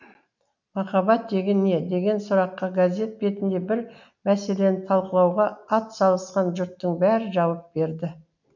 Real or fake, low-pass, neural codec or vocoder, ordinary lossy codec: real; 7.2 kHz; none; none